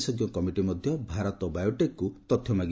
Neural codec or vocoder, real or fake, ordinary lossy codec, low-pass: none; real; none; none